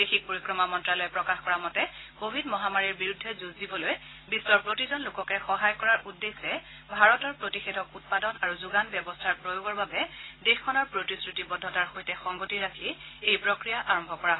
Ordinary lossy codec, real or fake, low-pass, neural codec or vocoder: AAC, 16 kbps; real; 7.2 kHz; none